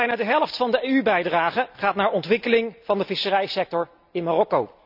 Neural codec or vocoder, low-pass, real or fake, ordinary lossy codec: none; 5.4 kHz; real; none